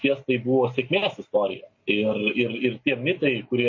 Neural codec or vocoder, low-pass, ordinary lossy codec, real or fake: none; 7.2 kHz; MP3, 32 kbps; real